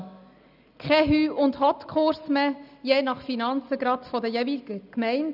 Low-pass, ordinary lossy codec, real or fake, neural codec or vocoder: 5.4 kHz; none; real; none